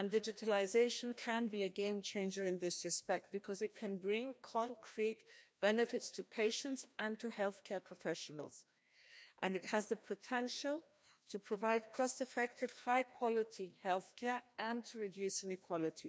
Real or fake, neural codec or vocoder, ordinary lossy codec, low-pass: fake; codec, 16 kHz, 1 kbps, FreqCodec, larger model; none; none